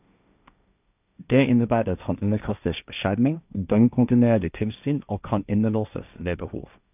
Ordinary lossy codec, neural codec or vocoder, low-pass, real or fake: none; codec, 16 kHz, 1.1 kbps, Voila-Tokenizer; 3.6 kHz; fake